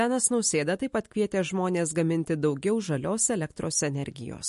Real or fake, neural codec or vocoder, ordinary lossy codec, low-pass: real; none; MP3, 48 kbps; 14.4 kHz